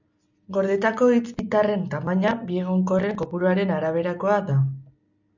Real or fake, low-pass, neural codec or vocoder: real; 7.2 kHz; none